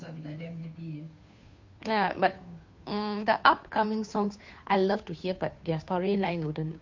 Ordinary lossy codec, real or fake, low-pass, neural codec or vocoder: MP3, 48 kbps; fake; 7.2 kHz; codec, 16 kHz, 2 kbps, FunCodec, trained on Chinese and English, 25 frames a second